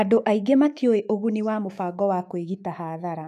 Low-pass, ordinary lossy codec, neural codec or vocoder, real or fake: 14.4 kHz; none; autoencoder, 48 kHz, 128 numbers a frame, DAC-VAE, trained on Japanese speech; fake